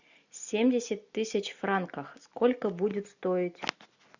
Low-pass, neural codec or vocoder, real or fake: 7.2 kHz; none; real